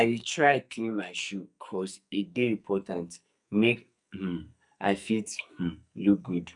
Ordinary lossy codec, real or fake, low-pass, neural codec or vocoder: none; fake; 10.8 kHz; codec, 44.1 kHz, 2.6 kbps, SNAC